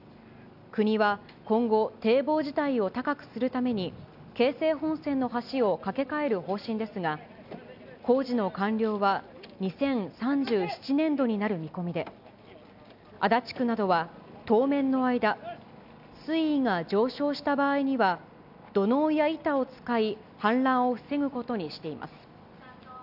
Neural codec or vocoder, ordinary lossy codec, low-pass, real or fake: none; none; 5.4 kHz; real